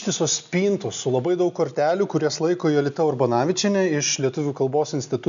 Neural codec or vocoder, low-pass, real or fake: none; 7.2 kHz; real